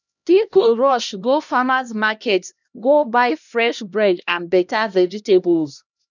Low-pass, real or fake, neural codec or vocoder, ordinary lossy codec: 7.2 kHz; fake; codec, 16 kHz, 1 kbps, X-Codec, HuBERT features, trained on LibriSpeech; none